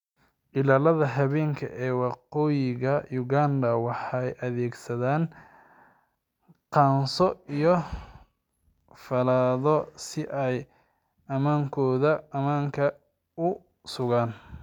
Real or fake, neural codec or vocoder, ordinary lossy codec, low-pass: real; none; none; 19.8 kHz